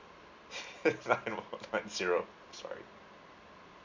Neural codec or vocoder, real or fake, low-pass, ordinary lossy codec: none; real; 7.2 kHz; MP3, 64 kbps